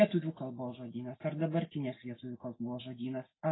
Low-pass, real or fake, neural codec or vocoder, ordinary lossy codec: 7.2 kHz; real; none; AAC, 16 kbps